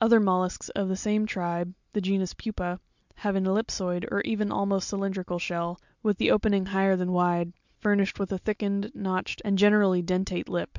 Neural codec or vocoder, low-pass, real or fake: none; 7.2 kHz; real